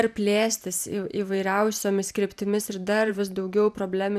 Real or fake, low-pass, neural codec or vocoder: fake; 14.4 kHz; vocoder, 44.1 kHz, 128 mel bands every 512 samples, BigVGAN v2